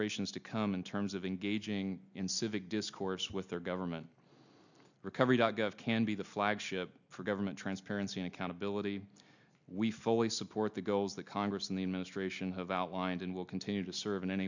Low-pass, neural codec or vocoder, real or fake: 7.2 kHz; none; real